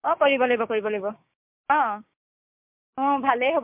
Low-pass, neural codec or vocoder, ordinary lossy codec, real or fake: 3.6 kHz; codec, 16 kHz, 6 kbps, DAC; MP3, 32 kbps; fake